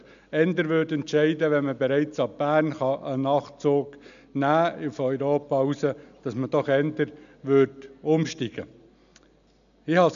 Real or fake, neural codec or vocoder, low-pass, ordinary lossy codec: real; none; 7.2 kHz; none